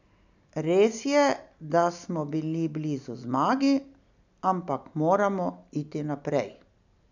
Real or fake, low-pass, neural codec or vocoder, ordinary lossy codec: real; 7.2 kHz; none; none